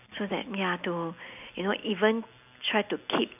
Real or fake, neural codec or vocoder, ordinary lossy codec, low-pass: real; none; none; 3.6 kHz